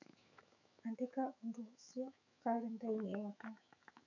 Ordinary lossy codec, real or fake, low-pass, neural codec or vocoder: none; fake; 7.2 kHz; autoencoder, 48 kHz, 128 numbers a frame, DAC-VAE, trained on Japanese speech